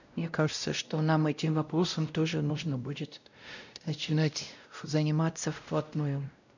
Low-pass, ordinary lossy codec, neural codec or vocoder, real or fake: 7.2 kHz; none; codec, 16 kHz, 0.5 kbps, X-Codec, WavLM features, trained on Multilingual LibriSpeech; fake